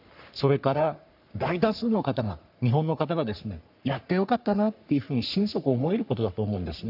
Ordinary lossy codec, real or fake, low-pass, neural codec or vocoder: none; fake; 5.4 kHz; codec, 44.1 kHz, 3.4 kbps, Pupu-Codec